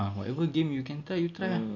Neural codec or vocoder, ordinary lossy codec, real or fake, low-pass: none; none; real; 7.2 kHz